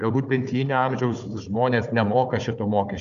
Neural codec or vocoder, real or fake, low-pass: codec, 16 kHz, 4 kbps, FunCodec, trained on Chinese and English, 50 frames a second; fake; 7.2 kHz